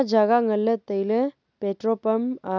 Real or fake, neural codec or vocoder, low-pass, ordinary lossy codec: real; none; 7.2 kHz; none